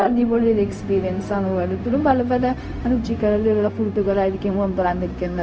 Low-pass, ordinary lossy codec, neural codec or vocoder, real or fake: none; none; codec, 16 kHz, 0.4 kbps, LongCat-Audio-Codec; fake